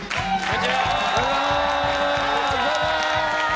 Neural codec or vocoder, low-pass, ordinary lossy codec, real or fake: none; none; none; real